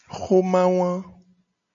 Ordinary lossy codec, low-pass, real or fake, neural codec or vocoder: MP3, 64 kbps; 7.2 kHz; real; none